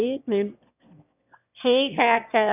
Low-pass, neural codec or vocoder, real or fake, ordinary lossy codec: 3.6 kHz; autoencoder, 22.05 kHz, a latent of 192 numbers a frame, VITS, trained on one speaker; fake; none